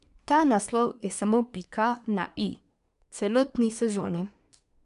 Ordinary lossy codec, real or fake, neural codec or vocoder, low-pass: none; fake; codec, 24 kHz, 1 kbps, SNAC; 10.8 kHz